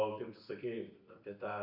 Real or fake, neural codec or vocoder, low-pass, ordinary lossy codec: real; none; 5.4 kHz; Opus, 64 kbps